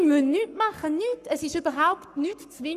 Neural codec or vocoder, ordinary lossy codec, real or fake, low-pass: codec, 44.1 kHz, 7.8 kbps, Pupu-Codec; none; fake; 14.4 kHz